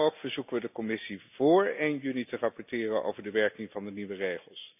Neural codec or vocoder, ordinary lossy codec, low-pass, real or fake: none; none; 3.6 kHz; real